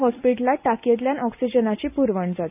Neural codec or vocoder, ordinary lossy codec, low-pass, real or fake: none; none; 3.6 kHz; real